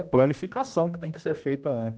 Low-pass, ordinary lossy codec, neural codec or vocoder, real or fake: none; none; codec, 16 kHz, 1 kbps, X-Codec, HuBERT features, trained on general audio; fake